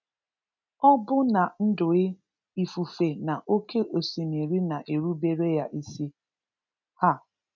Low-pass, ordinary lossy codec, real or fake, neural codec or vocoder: 7.2 kHz; none; real; none